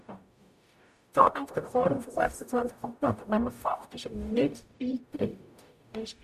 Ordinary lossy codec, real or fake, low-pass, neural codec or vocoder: none; fake; 14.4 kHz; codec, 44.1 kHz, 0.9 kbps, DAC